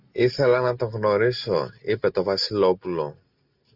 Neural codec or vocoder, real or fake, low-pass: none; real; 5.4 kHz